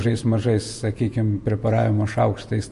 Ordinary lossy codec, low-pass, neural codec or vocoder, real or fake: MP3, 48 kbps; 14.4 kHz; vocoder, 48 kHz, 128 mel bands, Vocos; fake